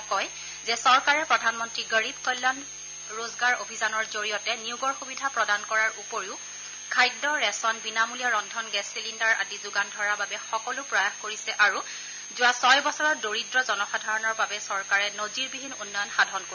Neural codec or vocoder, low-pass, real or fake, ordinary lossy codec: none; 7.2 kHz; real; none